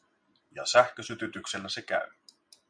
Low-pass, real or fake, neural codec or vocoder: 9.9 kHz; real; none